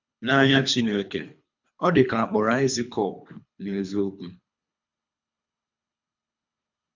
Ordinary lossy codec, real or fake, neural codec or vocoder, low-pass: MP3, 64 kbps; fake; codec, 24 kHz, 3 kbps, HILCodec; 7.2 kHz